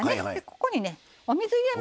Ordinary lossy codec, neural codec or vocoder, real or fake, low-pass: none; none; real; none